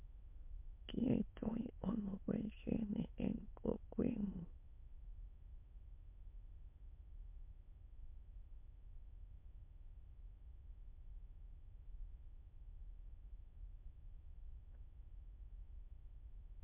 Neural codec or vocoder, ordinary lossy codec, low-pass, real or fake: autoencoder, 22.05 kHz, a latent of 192 numbers a frame, VITS, trained on many speakers; MP3, 32 kbps; 3.6 kHz; fake